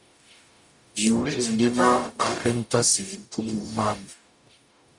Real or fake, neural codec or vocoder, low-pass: fake; codec, 44.1 kHz, 0.9 kbps, DAC; 10.8 kHz